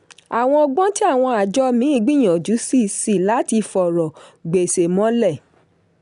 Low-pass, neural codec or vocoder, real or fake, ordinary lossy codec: 10.8 kHz; none; real; none